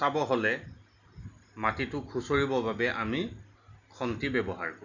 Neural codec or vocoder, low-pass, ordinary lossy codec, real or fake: none; 7.2 kHz; none; real